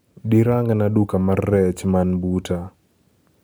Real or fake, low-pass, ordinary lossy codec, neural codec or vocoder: real; none; none; none